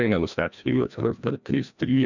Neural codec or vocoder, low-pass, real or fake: codec, 16 kHz, 1 kbps, FreqCodec, larger model; 7.2 kHz; fake